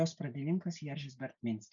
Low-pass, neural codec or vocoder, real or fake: 7.2 kHz; none; real